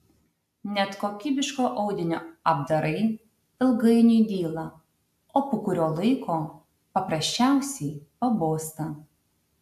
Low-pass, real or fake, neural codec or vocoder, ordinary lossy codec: 14.4 kHz; real; none; AAC, 96 kbps